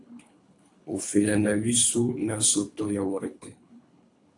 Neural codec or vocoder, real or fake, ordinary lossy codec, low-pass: codec, 24 kHz, 3 kbps, HILCodec; fake; AAC, 48 kbps; 10.8 kHz